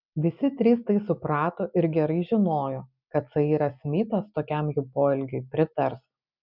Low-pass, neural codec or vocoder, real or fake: 5.4 kHz; none; real